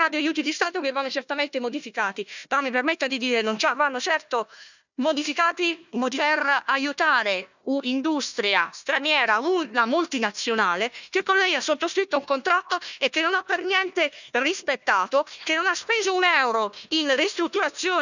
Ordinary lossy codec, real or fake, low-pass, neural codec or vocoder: none; fake; 7.2 kHz; codec, 16 kHz, 1 kbps, FunCodec, trained on Chinese and English, 50 frames a second